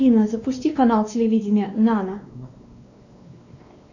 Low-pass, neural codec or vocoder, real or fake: 7.2 kHz; codec, 16 kHz, 2 kbps, X-Codec, WavLM features, trained on Multilingual LibriSpeech; fake